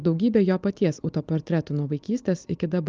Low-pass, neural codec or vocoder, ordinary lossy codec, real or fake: 7.2 kHz; none; Opus, 24 kbps; real